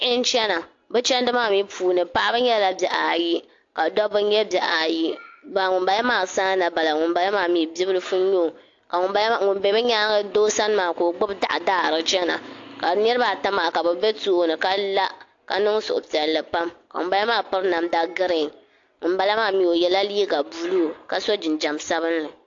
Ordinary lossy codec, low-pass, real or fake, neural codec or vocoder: AAC, 48 kbps; 7.2 kHz; real; none